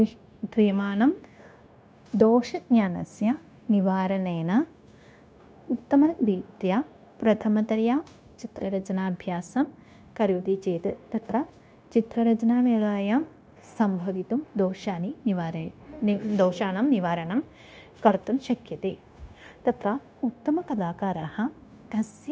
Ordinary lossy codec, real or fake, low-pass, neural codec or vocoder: none; fake; none; codec, 16 kHz, 0.9 kbps, LongCat-Audio-Codec